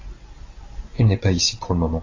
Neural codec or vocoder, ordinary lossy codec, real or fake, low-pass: none; AAC, 32 kbps; real; 7.2 kHz